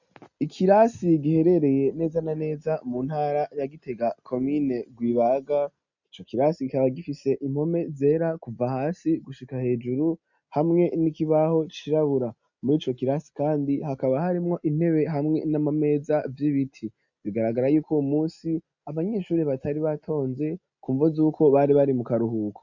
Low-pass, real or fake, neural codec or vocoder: 7.2 kHz; real; none